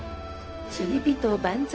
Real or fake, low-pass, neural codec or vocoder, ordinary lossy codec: fake; none; codec, 16 kHz, 0.4 kbps, LongCat-Audio-Codec; none